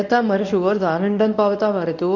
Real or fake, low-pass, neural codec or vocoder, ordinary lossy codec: fake; 7.2 kHz; codec, 24 kHz, 0.9 kbps, WavTokenizer, medium speech release version 1; none